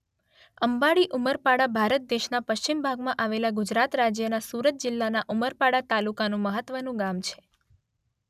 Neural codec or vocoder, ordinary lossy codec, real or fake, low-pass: none; none; real; 14.4 kHz